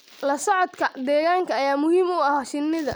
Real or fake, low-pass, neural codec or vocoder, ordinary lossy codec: real; none; none; none